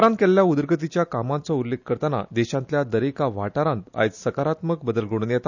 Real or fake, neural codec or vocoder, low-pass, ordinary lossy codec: real; none; 7.2 kHz; none